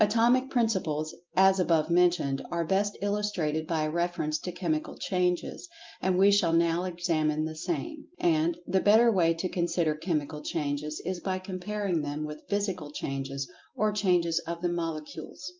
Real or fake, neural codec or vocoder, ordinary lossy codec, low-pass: real; none; Opus, 32 kbps; 7.2 kHz